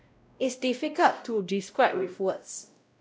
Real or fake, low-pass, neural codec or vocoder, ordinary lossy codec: fake; none; codec, 16 kHz, 0.5 kbps, X-Codec, WavLM features, trained on Multilingual LibriSpeech; none